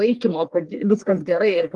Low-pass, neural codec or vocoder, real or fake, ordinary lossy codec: 10.8 kHz; codec, 44.1 kHz, 1.7 kbps, Pupu-Codec; fake; Opus, 24 kbps